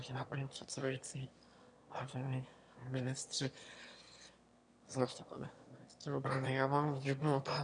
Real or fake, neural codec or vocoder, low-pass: fake; autoencoder, 22.05 kHz, a latent of 192 numbers a frame, VITS, trained on one speaker; 9.9 kHz